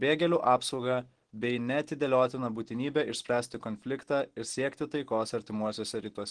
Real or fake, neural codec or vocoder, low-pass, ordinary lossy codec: real; none; 9.9 kHz; Opus, 16 kbps